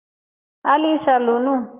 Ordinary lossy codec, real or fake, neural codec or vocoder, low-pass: Opus, 32 kbps; fake; codec, 44.1 kHz, 7.8 kbps, Pupu-Codec; 3.6 kHz